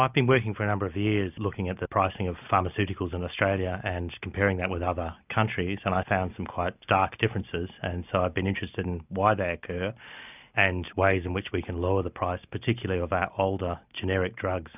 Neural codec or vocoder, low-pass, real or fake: none; 3.6 kHz; real